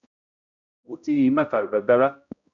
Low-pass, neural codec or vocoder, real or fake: 7.2 kHz; codec, 16 kHz, 0.5 kbps, X-Codec, HuBERT features, trained on balanced general audio; fake